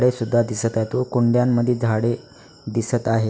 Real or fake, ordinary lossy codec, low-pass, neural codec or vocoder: real; none; none; none